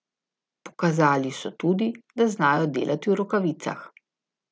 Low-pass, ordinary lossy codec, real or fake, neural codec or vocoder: none; none; real; none